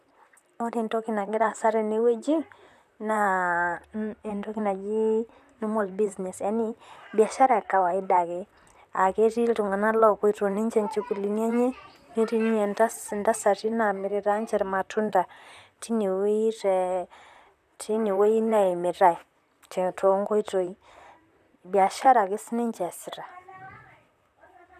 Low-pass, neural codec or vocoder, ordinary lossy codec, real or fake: 14.4 kHz; vocoder, 44.1 kHz, 128 mel bands, Pupu-Vocoder; none; fake